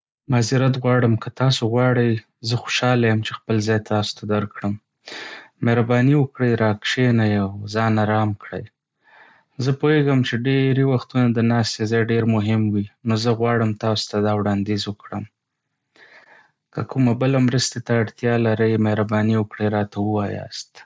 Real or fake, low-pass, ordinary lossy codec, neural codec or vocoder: real; none; none; none